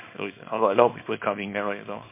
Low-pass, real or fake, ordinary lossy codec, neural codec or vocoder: 3.6 kHz; fake; MP3, 24 kbps; codec, 24 kHz, 0.9 kbps, WavTokenizer, small release